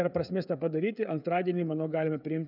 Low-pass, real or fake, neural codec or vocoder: 5.4 kHz; fake; codec, 16 kHz, 16 kbps, FreqCodec, smaller model